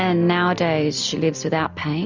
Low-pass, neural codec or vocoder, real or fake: 7.2 kHz; none; real